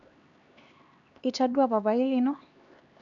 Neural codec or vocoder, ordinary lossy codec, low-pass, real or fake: codec, 16 kHz, 2 kbps, X-Codec, HuBERT features, trained on LibriSpeech; none; 7.2 kHz; fake